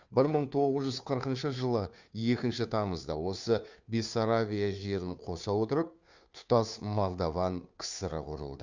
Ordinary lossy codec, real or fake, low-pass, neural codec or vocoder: Opus, 64 kbps; fake; 7.2 kHz; codec, 16 kHz, 2 kbps, FunCodec, trained on Chinese and English, 25 frames a second